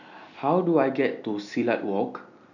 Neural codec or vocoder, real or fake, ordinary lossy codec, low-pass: none; real; none; 7.2 kHz